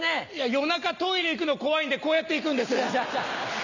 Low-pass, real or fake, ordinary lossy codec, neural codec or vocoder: 7.2 kHz; real; none; none